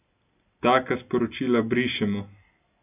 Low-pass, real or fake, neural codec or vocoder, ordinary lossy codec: 3.6 kHz; real; none; none